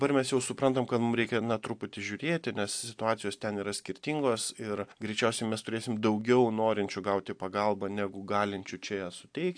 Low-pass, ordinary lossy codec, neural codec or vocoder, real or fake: 9.9 kHz; AAC, 64 kbps; none; real